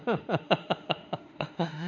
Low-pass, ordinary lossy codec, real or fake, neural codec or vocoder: 7.2 kHz; none; fake; autoencoder, 48 kHz, 32 numbers a frame, DAC-VAE, trained on Japanese speech